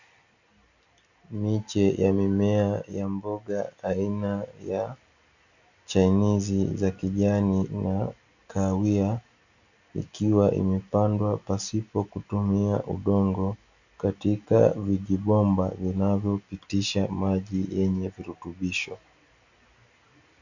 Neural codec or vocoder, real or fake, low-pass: none; real; 7.2 kHz